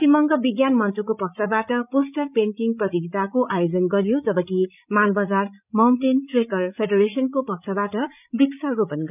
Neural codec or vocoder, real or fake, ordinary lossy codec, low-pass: codec, 16 kHz, 16 kbps, FreqCodec, larger model; fake; none; 3.6 kHz